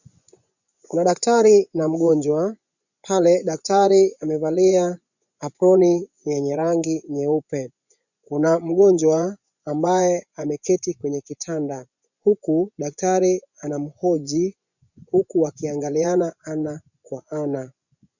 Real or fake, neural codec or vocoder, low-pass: fake; vocoder, 24 kHz, 100 mel bands, Vocos; 7.2 kHz